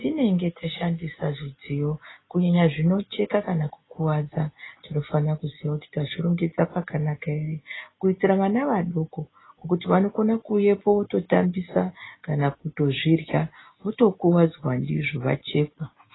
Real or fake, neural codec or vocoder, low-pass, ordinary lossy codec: real; none; 7.2 kHz; AAC, 16 kbps